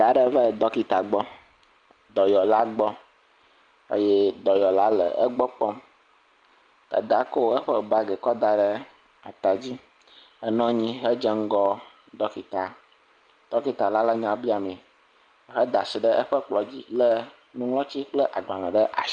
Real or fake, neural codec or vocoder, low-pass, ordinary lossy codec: real; none; 9.9 kHz; Opus, 32 kbps